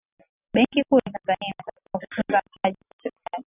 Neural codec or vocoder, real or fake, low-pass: none; real; 3.6 kHz